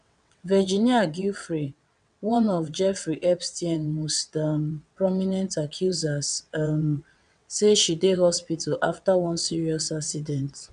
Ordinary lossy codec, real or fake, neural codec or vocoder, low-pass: none; fake; vocoder, 22.05 kHz, 80 mel bands, WaveNeXt; 9.9 kHz